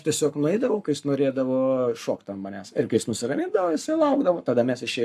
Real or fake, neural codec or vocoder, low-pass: fake; codec, 44.1 kHz, 7.8 kbps, Pupu-Codec; 14.4 kHz